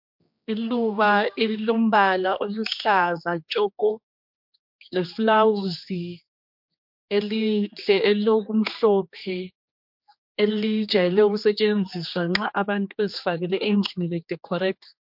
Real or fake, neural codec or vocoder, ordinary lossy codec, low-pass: fake; codec, 16 kHz, 2 kbps, X-Codec, HuBERT features, trained on general audio; MP3, 48 kbps; 5.4 kHz